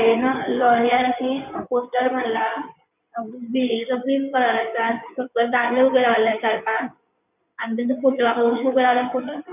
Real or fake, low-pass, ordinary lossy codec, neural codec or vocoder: fake; 3.6 kHz; none; codec, 16 kHz in and 24 kHz out, 2.2 kbps, FireRedTTS-2 codec